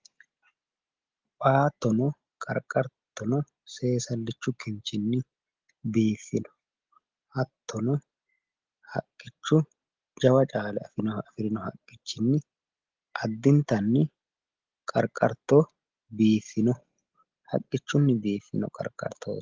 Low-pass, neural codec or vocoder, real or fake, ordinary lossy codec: 7.2 kHz; none; real; Opus, 16 kbps